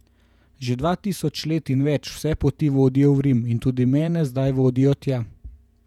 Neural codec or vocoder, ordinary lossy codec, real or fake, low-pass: vocoder, 48 kHz, 128 mel bands, Vocos; none; fake; 19.8 kHz